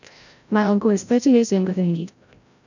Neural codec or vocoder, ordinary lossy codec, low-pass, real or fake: codec, 16 kHz, 0.5 kbps, FreqCodec, larger model; none; 7.2 kHz; fake